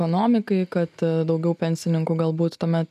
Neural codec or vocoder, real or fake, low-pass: none; real; 14.4 kHz